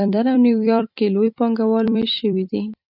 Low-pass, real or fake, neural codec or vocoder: 5.4 kHz; fake; vocoder, 44.1 kHz, 128 mel bands every 256 samples, BigVGAN v2